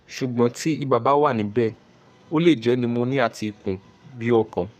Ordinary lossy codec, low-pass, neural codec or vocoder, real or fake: none; 14.4 kHz; codec, 32 kHz, 1.9 kbps, SNAC; fake